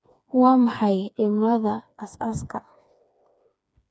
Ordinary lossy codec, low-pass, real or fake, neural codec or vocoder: none; none; fake; codec, 16 kHz, 4 kbps, FreqCodec, smaller model